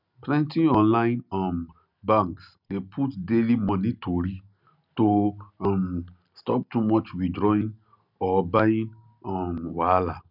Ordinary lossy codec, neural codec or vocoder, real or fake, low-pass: none; none; real; 5.4 kHz